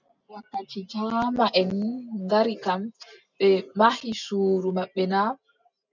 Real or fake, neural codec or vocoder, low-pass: real; none; 7.2 kHz